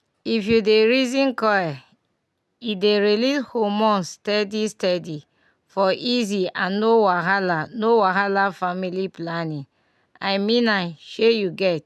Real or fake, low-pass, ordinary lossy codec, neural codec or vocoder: real; none; none; none